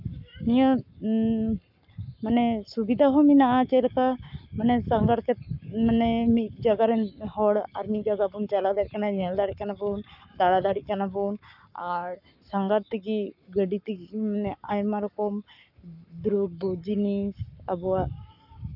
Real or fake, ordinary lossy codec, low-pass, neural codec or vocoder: fake; none; 5.4 kHz; codec, 44.1 kHz, 7.8 kbps, Pupu-Codec